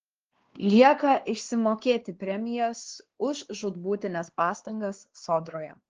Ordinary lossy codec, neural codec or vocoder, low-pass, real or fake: Opus, 16 kbps; codec, 16 kHz, 2 kbps, X-Codec, WavLM features, trained on Multilingual LibriSpeech; 7.2 kHz; fake